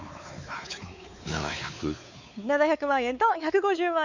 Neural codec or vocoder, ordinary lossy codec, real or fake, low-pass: codec, 16 kHz, 4 kbps, X-Codec, WavLM features, trained on Multilingual LibriSpeech; none; fake; 7.2 kHz